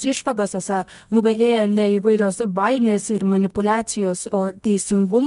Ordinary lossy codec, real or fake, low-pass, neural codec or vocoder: MP3, 96 kbps; fake; 10.8 kHz; codec, 24 kHz, 0.9 kbps, WavTokenizer, medium music audio release